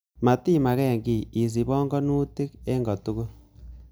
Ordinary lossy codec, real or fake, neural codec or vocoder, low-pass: none; real; none; none